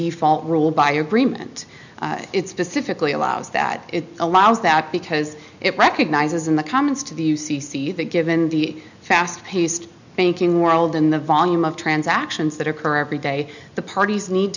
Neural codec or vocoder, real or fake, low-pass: none; real; 7.2 kHz